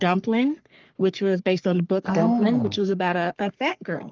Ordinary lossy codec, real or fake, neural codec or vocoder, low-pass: Opus, 24 kbps; fake; codec, 44.1 kHz, 3.4 kbps, Pupu-Codec; 7.2 kHz